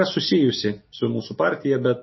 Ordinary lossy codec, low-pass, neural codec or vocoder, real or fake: MP3, 24 kbps; 7.2 kHz; none; real